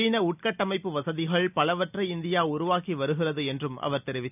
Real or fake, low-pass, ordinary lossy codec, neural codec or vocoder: real; 3.6 kHz; none; none